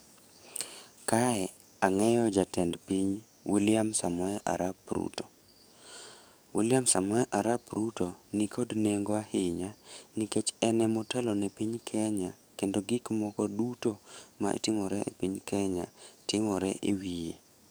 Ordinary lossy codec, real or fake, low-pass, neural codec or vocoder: none; fake; none; codec, 44.1 kHz, 7.8 kbps, DAC